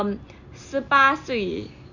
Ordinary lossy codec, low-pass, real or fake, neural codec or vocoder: none; 7.2 kHz; real; none